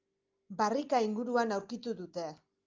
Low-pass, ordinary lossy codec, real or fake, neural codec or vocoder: 7.2 kHz; Opus, 24 kbps; real; none